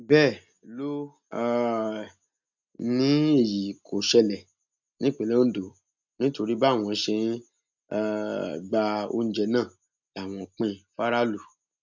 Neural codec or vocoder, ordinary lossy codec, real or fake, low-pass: none; none; real; 7.2 kHz